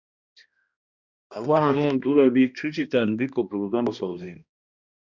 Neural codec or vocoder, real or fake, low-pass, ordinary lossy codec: codec, 16 kHz, 1 kbps, X-Codec, HuBERT features, trained on balanced general audio; fake; 7.2 kHz; Opus, 64 kbps